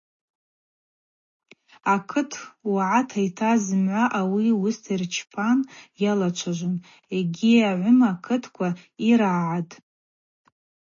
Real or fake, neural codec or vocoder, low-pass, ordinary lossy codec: real; none; 7.2 kHz; MP3, 32 kbps